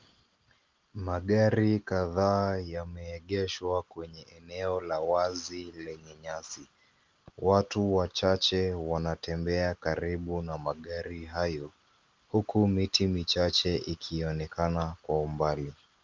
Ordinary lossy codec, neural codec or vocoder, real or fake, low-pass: Opus, 32 kbps; none; real; 7.2 kHz